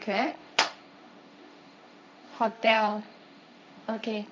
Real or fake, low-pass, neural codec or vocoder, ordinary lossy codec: fake; none; codec, 16 kHz, 1.1 kbps, Voila-Tokenizer; none